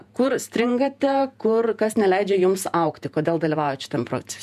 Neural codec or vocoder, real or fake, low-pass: vocoder, 48 kHz, 128 mel bands, Vocos; fake; 14.4 kHz